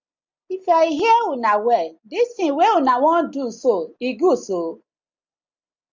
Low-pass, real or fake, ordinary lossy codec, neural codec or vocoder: 7.2 kHz; real; MP3, 64 kbps; none